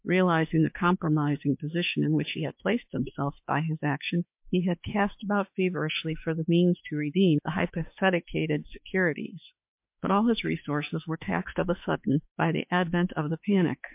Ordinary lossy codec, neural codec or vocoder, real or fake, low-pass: MP3, 32 kbps; autoencoder, 48 kHz, 32 numbers a frame, DAC-VAE, trained on Japanese speech; fake; 3.6 kHz